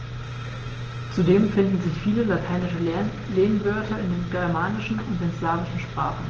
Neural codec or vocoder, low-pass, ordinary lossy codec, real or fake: none; 7.2 kHz; Opus, 16 kbps; real